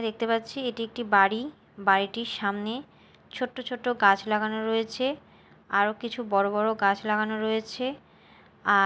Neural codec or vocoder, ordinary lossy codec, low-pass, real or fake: none; none; none; real